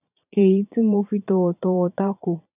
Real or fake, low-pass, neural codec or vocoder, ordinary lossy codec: fake; 3.6 kHz; vocoder, 22.05 kHz, 80 mel bands, Vocos; none